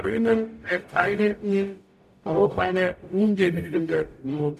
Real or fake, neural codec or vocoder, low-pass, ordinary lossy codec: fake; codec, 44.1 kHz, 0.9 kbps, DAC; 14.4 kHz; none